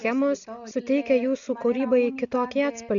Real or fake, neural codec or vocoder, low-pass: real; none; 7.2 kHz